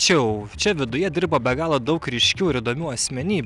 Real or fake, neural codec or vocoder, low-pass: real; none; 10.8 kHz